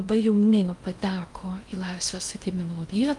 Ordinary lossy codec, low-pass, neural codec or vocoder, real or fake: Opus, 32 kbps; 10.8 kHz; codec, 16 kHz in and 24 kHz out, 0.6 kbps, FocalCodec, streaming, 2048 codes; fake